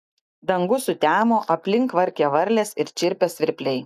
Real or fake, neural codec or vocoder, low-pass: real; none; 14.4 kHz